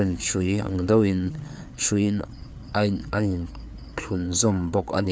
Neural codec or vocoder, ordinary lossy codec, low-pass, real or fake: codec, 16 kHz, 4 kbps, FreqCodec, larger model; none; none; fake